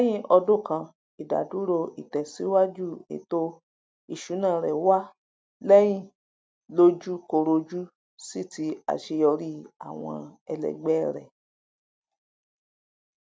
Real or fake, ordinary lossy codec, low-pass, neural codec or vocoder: real; none; none; none